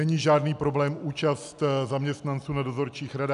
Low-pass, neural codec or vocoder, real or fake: 10.8 kHz; none; real